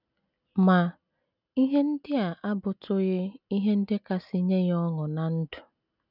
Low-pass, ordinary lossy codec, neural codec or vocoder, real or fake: 5.4 kHz; none; none; real